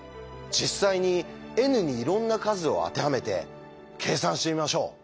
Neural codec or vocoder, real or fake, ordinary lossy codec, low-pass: none; real; none; none